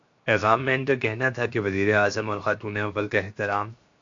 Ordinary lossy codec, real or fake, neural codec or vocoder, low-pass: AAC, 64 kbps; fake; codec, 16 kHz, 0.7 kbps, FocalCodec; 7.2 kHz